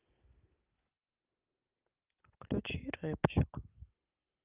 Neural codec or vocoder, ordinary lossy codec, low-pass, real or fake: none; Opus, 24 kbps; 3.6 kHz; real